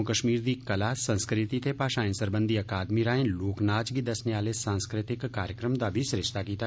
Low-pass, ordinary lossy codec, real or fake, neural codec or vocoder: none; none; real; none